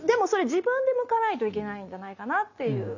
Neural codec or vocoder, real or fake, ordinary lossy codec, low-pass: none; real; none; 7.2 kHz